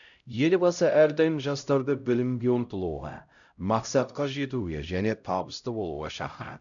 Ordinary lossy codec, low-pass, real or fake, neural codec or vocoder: none; 7.2 kHz; fake; codec, 16 kHz, 0.5 kbps, X-Codec, HuBERT features, trained on LibriSpeech